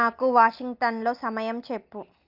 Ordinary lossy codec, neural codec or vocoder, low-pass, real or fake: Opus, 24 kbps; none; 5.4 kHz; real